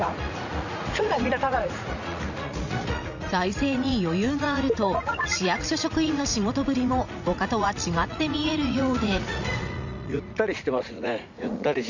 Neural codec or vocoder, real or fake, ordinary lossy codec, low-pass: vocoder, 44.1 kHz, 80 mel bands, Vocos; fake; none; 7.2 kHz